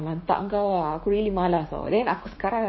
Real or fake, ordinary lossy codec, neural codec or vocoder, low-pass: fake; MP3, 24 kbps; codec, 16 kHz, 6 kbps, DAC; 7.2 kHz